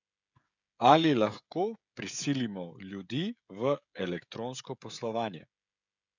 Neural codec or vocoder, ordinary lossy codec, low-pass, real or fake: codec, 16 kHz, 16 kbps, FreqCodec, smaller model; none; 7.2 kHz; fake